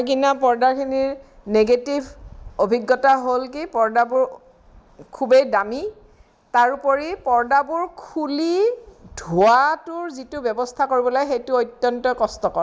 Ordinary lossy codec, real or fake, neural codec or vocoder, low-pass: none; real; none; none